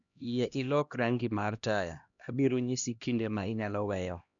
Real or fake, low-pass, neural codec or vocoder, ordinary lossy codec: fake; 7.2 kHz; codec, 16 kHz, 1 kbps, X-Codec, HuBERT features, trained on LibriSpeech; none